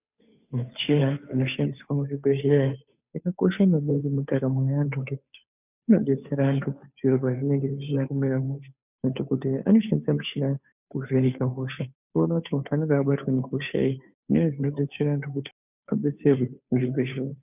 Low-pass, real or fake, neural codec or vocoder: 3.6 kHz; fake; codec, 16 kHz, 2 kbps, FunCodec, trained on Chinese and English, 25 frames a second